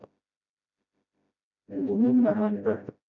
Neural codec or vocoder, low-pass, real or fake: codec, 16 kHz, 0.5 kbps, FreqCodec, smaller model; 7.2 kHz; fake